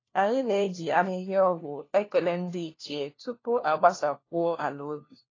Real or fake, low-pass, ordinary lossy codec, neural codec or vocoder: fake; 7.2 kHz; AAC, 32 kbps; codec, 16 kHz, 1 kbps, FunCodec, trained on LibriTTS, 50 frames a second